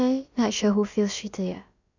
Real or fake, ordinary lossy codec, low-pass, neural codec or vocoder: fake; none; 7.2 kHz; codec, 16 kHz, about 1 kbps, DyCAST, with the encoder's durations